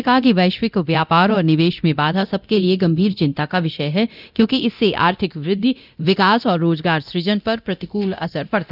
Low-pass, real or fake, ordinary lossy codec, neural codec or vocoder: 5.4 kHz; fake; none; codec, 24 kHz, 0.9 kbps, DualCodec